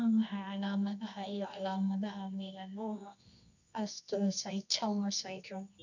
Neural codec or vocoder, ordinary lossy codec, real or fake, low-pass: codec, 24 kHz, 0.9 kbps, WavTokenizer, medium music audio release; none; fake; 7.2 kHz